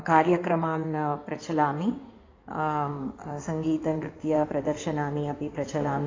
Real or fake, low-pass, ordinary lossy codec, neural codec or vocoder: fake; 7.2 kHz; AAC, 32 kbps; codec, 16 kHz in and 24 kHz out, 2.2 kbps, FireRedTTS-2 codec